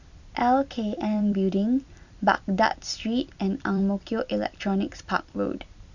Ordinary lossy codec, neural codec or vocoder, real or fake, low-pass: none; vocoder, 44.1 kHz, 128 mel bands every 256 samples, BigVGAN v2; fake; 7.2 kHz